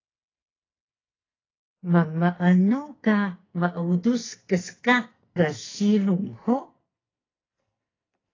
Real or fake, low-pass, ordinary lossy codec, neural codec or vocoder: fake; 7.2 kHz; AAC, 32 kbps; codec, 44.1 kHz, 2.6 kbps, SNAC